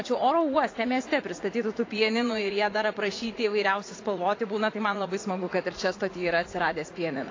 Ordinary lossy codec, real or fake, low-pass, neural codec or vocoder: AAC, 32 kbps; fake; 7.2 kHz; vocoder, 44.1 kHz, 80 mel bands, Vocos